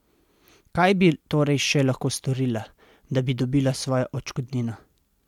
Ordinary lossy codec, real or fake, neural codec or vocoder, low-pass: MP3, 96 kbps; real; none; 19.8 kHz